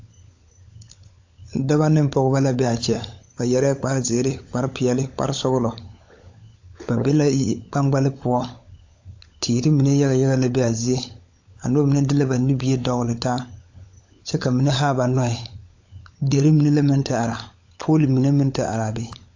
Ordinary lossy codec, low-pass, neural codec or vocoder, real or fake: AAC, 48 kbps; 7.2 kHz; codec, 16 kHz, 16 kbps, FunCodec, trained on LibriTTS, 50 frames a second; fake